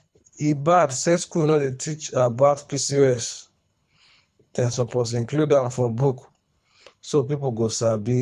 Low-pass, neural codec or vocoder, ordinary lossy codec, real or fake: none; codec, 24 kHz, 3 kbps, HILCodec; none; fake